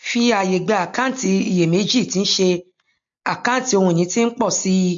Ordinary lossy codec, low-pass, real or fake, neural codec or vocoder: MP3, 48 kbps; 7.2 kHz; real; none